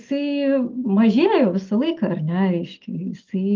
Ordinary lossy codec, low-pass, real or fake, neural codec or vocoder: Opus, 32 kbps; 7.2 kHz; real; none